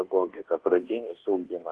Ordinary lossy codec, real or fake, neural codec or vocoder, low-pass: Opus, 16 kbps; fake; codec, 24 kHz, 1.2 kbps, DualCodec; 10.8 kHz